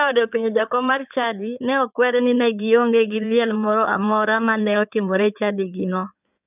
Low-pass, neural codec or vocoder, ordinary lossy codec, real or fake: 3.6 kHz; codec, 16 kHz in and 24 kHz out, 2.2 kbps, FireRedTTS-2 codec; none; fake